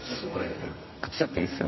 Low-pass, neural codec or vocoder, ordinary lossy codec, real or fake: 7.2 kHz; codec, 32 kHz, 1.9 kbps, SNAC; MP3, 24 kbps; fake